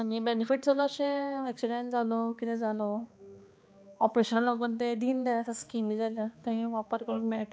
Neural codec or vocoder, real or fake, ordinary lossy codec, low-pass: codec, 16 kHz, 2 kbps, X-Codec, HuBERT features, trained on balanced general audio; fake; none; none